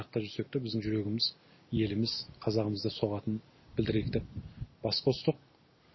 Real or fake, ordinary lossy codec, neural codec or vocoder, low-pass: real; MP3, 24 kbps; none; 7.2 kHz